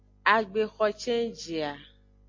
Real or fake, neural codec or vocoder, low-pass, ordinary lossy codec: real; none; 7.2 kHz; MP3, 48 kbps